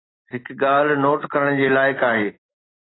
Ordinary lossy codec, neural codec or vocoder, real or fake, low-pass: AAC, 16 kbps; none; real; 7.2 kHz